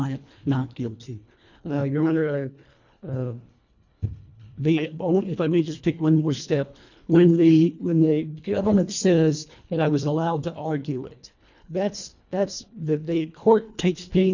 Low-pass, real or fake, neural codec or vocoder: 7.2 kHz; fake; codec, 24 kHz, 1.5 kbps, HILCodec